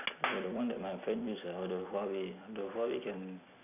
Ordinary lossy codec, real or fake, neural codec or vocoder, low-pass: none; real; none; 3.6 kHz